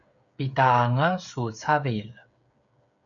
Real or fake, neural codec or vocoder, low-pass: fake; codec, 16 kHz, 8 kbps, FreqCodec, smaller model; 7.2 kHz